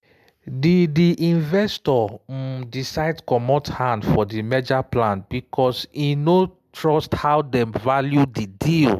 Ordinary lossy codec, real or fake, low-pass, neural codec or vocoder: MP3, 96 kbps; real; 14.4 kHz; none